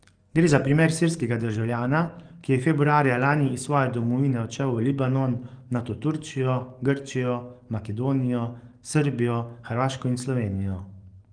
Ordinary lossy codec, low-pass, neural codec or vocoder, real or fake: Opus, 24 kbps; 9.9 kHz; codec, 44.1 kHz, 7.8 kbps, DAC; fake